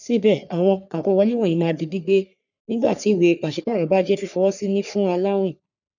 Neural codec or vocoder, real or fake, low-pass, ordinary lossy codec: codec, 44.1 kHz, 3.4 kbps, Pupu-Codec; fake; 7.2 kHz; none